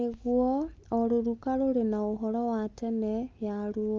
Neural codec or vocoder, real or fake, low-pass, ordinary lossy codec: none; real; 7.2 kHz; Opus, 24 kbps